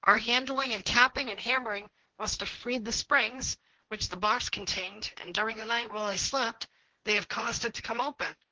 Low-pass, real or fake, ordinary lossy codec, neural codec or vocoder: 7.2 kHz; fake; Opus, 16 kbps; codec, 16 kHz, 1.1 kbps, Voila-Tokenizer